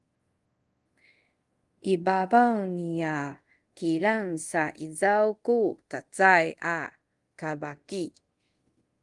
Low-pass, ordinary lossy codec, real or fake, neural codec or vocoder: 10.8 kHz; Opus, 24 kbps; fake; codec, 24 kHz, 0.5 kbps, DualCodec